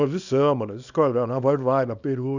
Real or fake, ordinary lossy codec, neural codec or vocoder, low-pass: fake; none; codec, 24 kHz, 0.9 kbps, WavTokenizer, small release; 7.2 kHz